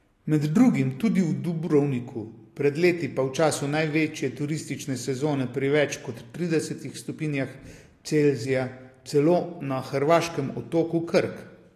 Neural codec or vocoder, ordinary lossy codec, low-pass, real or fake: none; AAC, 48 kbps; 14.4 kHz; real